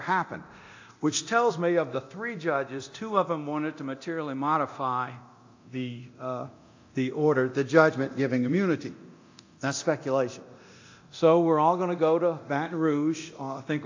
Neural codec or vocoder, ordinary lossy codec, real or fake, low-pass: codec, 24 kHz, 0.9 kbps, DualCodec; AAC, 48 kbps; fake; 7.2 kHz